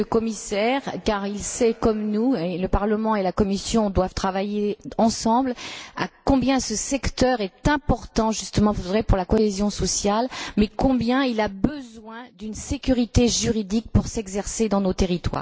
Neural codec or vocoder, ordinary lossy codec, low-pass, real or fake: none; none; none; real